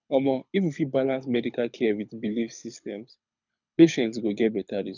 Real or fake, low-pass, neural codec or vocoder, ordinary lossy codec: fake; 7.2 kHz; codec, 24 kHz, 6 kbps, HILCodec; none